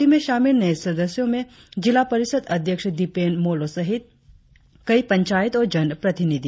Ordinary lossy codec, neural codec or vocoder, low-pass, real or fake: none; none; none; real